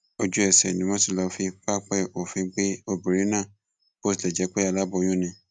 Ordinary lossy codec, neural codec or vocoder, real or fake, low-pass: none; none; real; none